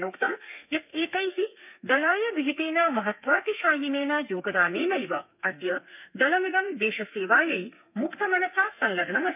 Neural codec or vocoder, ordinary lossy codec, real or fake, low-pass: codec, 32 kHz, 1.9 kbps, SNAC; none; fake; 3.6 kHz